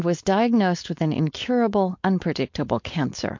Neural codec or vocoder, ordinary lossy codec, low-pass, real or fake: codec, 16 kHz, 4.8 kbps, FACodec; MP3, 48 kbps; 7.2 kHz; fake